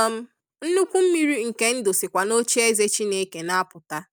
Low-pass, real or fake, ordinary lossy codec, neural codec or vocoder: none; real; none; none